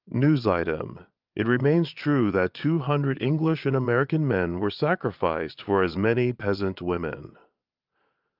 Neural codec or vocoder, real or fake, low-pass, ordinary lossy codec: vocoder, 22.05 kHz, 80 mel bands, Vocos; fake; 5.4 kHz; Opus, 24 kbps